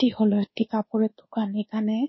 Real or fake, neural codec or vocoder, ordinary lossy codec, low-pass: fake; codec, 24 kHz, 1.2 kbps, DualCodec; MP3, 24 kbps; 7.2 kHz